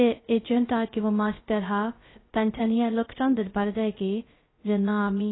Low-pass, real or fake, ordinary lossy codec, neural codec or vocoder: 7.2 kHz; fake; AAC, 16 kbps; codec, 16 kHz, 0.2 kbps, FocalCodec